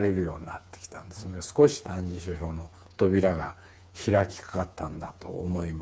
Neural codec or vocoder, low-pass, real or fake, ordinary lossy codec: codec, 16 kHz, 4 kbps, FreqCodec, smaller model; none; fake; none